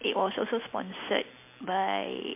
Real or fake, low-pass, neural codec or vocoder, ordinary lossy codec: real; 3.6 kHz; none; MP3, 24 kbps